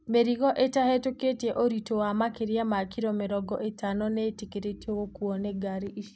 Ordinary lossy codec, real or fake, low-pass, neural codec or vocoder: none; real; none; none